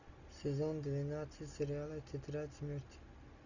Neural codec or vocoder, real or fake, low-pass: none; real; 7.2 kHz